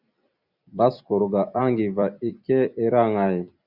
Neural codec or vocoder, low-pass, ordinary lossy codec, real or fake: vocoder, 44.1 kHz, 128 mel bands every 256 samples, BigVGAN v2; 5.4 kHz; Opus, 64 kbps; fake